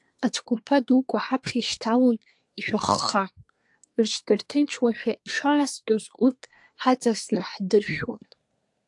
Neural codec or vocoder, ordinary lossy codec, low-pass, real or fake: codec, 24 kHz, 1 kbps, SNAC; AAC, 64 kbps; 10.8 kHz; fake